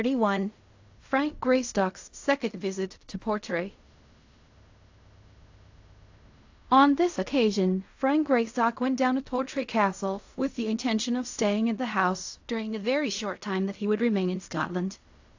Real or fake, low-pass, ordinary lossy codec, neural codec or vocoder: fake; 7.2 kHz; AAC, 48 kbps; codec, 16 kHz in and 24 kHz out, 0.4 kbps, LongCat-Audio-Codec, fine tuned four codebook decoder